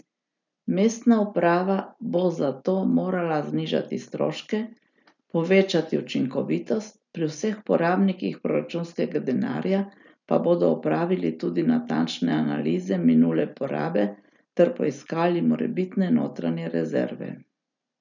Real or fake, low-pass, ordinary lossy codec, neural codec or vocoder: real; 7.2 kHz; none; none